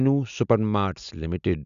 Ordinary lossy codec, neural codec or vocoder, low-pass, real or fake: none; none; 7.2 kHz; real